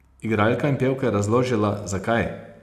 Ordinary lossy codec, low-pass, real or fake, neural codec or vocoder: none; 14.4 kHz; real; none